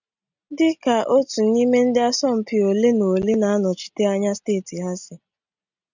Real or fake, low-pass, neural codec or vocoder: real; 7.2 kHz; none